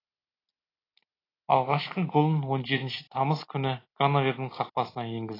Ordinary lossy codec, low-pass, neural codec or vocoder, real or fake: AAC, 24 kbps; 5.4 kHz; none; real